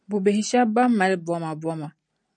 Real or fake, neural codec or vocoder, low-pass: real; none; 9.9 kHz